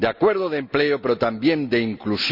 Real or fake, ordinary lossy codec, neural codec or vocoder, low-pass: real; Opus, 64 kbps; none; 5.4 kHz